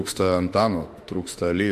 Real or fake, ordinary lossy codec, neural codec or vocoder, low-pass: fake; MP3, 64 kbps; autoencoder, 48 kHz, 32 numbers a frame, DAC-VAE, trained on Japanese speech; 14.4 kHz